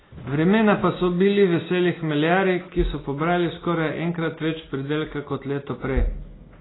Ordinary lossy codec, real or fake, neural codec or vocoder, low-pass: AAC, 16 kbps; real; none; 7.2 kHz